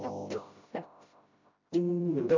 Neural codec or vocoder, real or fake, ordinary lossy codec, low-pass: codec, 16 kHz, 0.5 kbps, FreqCodec, smaller model; fake; MP3, 64 kbps; 7.2 kHz